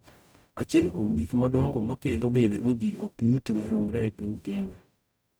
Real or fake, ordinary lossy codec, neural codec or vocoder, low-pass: fake; none; codec, 44.1 kHz, 0.9 kbps, DAC; none